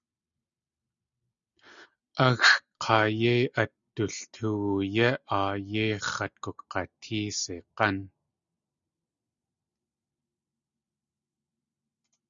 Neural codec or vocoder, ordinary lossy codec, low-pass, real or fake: none; Opus, 64 kbps; 7.2 kHz; real